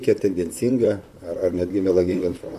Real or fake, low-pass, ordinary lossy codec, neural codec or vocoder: fake; 14.4 kHz; MP3, 64 kbps; vocoder, 44.1 kHz, 128 mel bands, Pupu-Vocoder